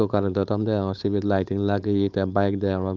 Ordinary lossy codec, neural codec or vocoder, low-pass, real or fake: Opus, 32 kbps; codec, 16 kHz, 4.8 kbps, FACodec; 7.2 kHz; fake